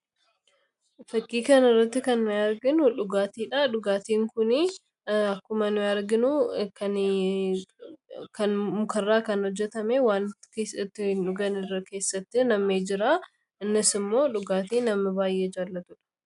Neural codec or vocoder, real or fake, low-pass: none; real; 10.8 kHz